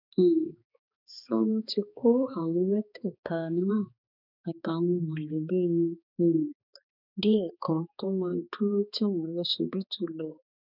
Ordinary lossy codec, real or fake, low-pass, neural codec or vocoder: none; fake; 5.4 kHz; codec, 16 kHz, 2 kbps, X-Codec, HuBERT features, trained on balanced general audio